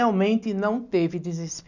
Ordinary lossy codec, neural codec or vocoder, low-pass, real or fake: none; none; 7.2 kHz; real